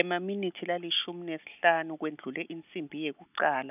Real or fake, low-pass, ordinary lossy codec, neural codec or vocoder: real; 3.6 kHz; none; none